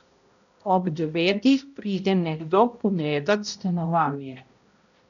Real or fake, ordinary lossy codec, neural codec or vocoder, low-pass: fake; none; codec, 16 kHz, 0.5 kbps, X-Codec, HuBERT features, trained on balanced general audio; 7.2 kHz